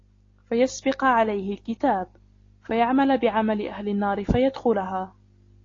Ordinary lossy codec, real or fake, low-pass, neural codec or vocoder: AAC, 32 kbps; real; 7.2 kHz; none